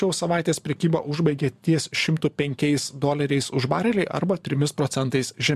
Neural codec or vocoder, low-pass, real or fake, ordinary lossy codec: vocoder, 44.1 kHz, 128 mel bands every 512 samples, BigVGAN v2; 14.4 kHz; fake; MP3, 96 kbps